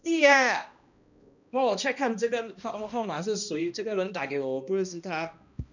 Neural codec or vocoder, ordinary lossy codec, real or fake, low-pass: codec, 16 kHz, 1 kbps, X-Codec, HuBERT features, trained on balanced general audio; none; fake; 7.2 kHz